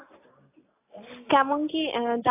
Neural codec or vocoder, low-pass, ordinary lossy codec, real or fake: none; 3.6 kHz; AAC, 16 kbps; real